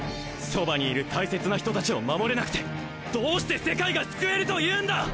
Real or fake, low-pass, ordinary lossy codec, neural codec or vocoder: real; none; none; none